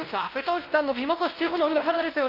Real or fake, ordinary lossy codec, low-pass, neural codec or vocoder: fake; Opus, 24 kbps; 5.4 kHz; codec, 16 kHz, 1 kbps, X-Codec, WavLM features, trained on Multilingual LibriSpeech